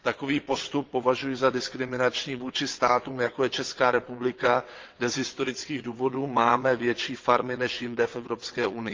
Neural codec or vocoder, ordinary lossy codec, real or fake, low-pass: vocoder, 22.05 kHz, 80 mel bands, WaveNeXt; Opus, 32 kbps; fake; 7.2 kHz